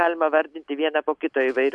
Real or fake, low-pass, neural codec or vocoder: real; 10.8 kHz; none